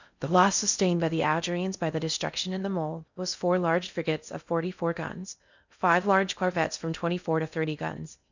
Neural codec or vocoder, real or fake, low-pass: codec, 16 kHz in and 24 kHz out, 0.6 kbps, FocalCodec, streaming, 4096 codes; fake; 7.2 kHz